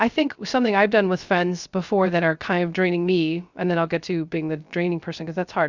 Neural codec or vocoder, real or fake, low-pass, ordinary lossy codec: codec, 16 kHz, 0.3 kbps, FocalCodec; fake; 7.2 kHz; Opus, 64 kbps